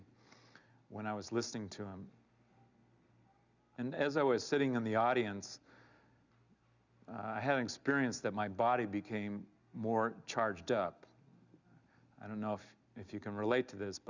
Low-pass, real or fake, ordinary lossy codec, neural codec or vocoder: 7.2 kHz; real; Opus, 64 kbps; none